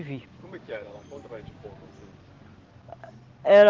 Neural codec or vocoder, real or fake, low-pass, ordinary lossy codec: none; real; 7.2 kHz; Opus, 16 kbps